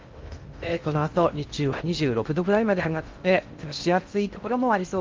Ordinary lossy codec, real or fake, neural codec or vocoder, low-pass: Opus, 32 kbps; fake; codec, 16 kHz in and 24 kHz out, 0.6 kbps, FocalCodec, streaming, 2048 codes; 7.2 kHz